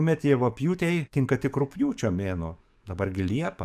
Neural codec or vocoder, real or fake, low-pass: codec, 44.1 kHz, 7.8 kbps, DAC; fake; 14.4 kHz